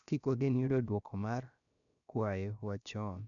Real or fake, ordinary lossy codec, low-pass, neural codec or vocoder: fake; none; 7.2 kHz; codec, 16 kHz, about 1 kbps, DyCAST, with the encoder's durations